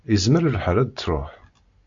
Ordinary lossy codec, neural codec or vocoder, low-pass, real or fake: AAC, 48 kbps; none; 7.2 kHz; real